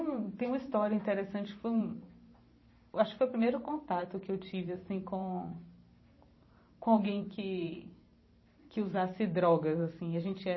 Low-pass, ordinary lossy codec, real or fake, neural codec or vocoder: 7.2 kHz; MP3, 24 kbps; fake; vocoder, 44.1 kHz, 128 mel bands every 512 samples, BigVGAN v2